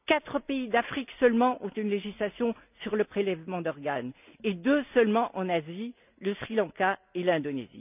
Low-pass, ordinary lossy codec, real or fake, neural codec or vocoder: 3.6 kHz; none; real; none